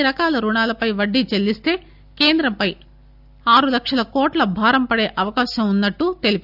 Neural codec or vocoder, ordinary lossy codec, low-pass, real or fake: none; none; 5.4 kHz; real